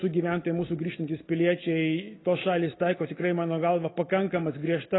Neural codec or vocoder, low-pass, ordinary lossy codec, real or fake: none; 7.2 kHz; AAC, 16 kbps; real